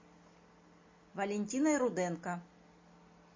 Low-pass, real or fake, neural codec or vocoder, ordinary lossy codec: 7.2 kHz; real; none; MP3, 32 kbps